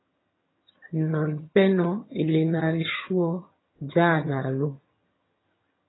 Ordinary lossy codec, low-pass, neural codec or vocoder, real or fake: AAC, 16 kbps; 7.2 kHz; vocoder, 22.05 kHz, 80 mel bands, HiFi-GAN; fake